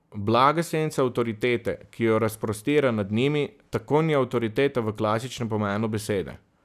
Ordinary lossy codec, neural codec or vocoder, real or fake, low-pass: none; none; real; 14.4 kHz